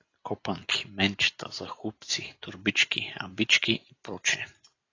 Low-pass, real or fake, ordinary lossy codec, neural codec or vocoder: 7.2 kHz; real; AAC, 32 kbps; none